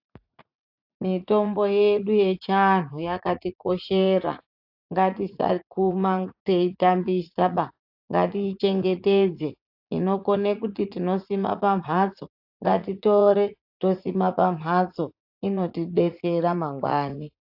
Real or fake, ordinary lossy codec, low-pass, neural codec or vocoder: fake; AAC, 48 kbps; 5.4 kHz; vocoder, 44.1 kHz, 80 mel bands, Vocos